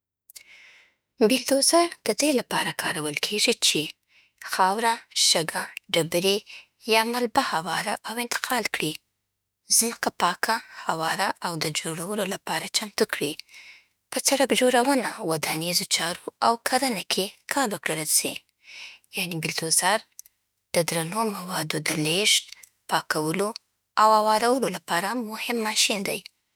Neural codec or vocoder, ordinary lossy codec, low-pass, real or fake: autoencoder, 48 kHz, 32 numbers a frame, DAC-VAE, trained on Japanese speech; none; none; fake